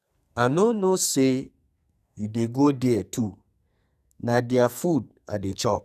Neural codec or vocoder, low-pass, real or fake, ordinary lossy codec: codec, 44.1 kHz, 2.6 kbps, SNAC; 14.4 kHz; fake; none